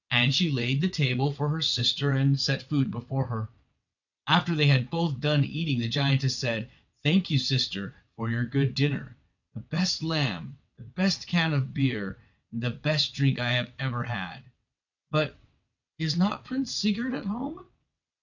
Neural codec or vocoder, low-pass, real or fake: vocoder, 22.05 kHz, 80 mel bands, WaveNeXt; 7.2 kHz; fake